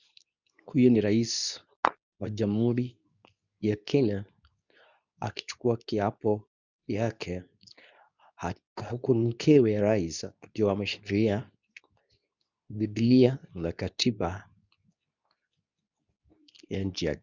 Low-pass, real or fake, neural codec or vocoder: 7.2 kHz; fake; codec, 24 kHz, 0.9 kbps, WavTokenizer, medium speech release version 2